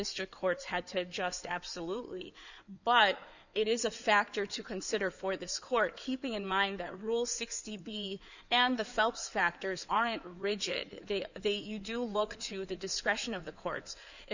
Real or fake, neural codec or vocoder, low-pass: fake; codec, 16 kHz in and 24 kHz out, 2.2 kbps, FireRedTTS-2 codec; 7.2 kHz